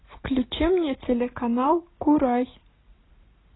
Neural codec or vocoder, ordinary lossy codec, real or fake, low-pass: none; AAC, 16 kbps; real; 7.2 kHz